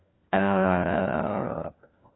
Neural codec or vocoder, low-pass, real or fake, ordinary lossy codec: codec, 16 kHz, 1 kbps, FunCodec, trained on LibriTTS, 50 frames a second; 7.2 kHz; fake; AAC, 16 kbps